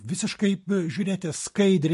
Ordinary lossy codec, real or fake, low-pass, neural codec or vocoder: MP3, 48 kbps; real; 14.4 kHz; none